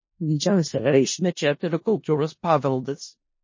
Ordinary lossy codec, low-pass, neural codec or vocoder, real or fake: MP3, 32 kbps; 7.2 kHz; codec, 16 kHz in and 24 kHz out, 0.4 kbps, LongCat-Audio-Codec, four codebook decoder; fake